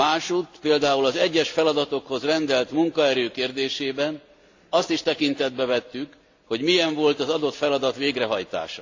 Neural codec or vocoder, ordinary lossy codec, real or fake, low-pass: none; AAC, 48 kbps; real; 7.2 kHz